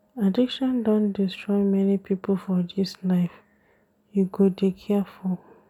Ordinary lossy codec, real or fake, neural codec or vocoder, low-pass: none; real; none; 19.8 kHz